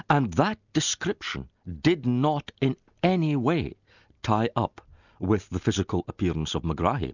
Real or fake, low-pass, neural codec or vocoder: real; 7.2 kHz; none